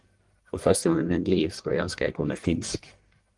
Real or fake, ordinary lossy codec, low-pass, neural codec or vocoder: fake; Opus, 24 kbps; 10.8 kHz; codec, 44.1 kHz, 1.7 kbps, Pupu-Codec